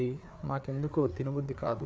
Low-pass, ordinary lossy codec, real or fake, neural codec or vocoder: none; none; fake; codec, 16 kHz, 4 kbps, FreqCodec, larger model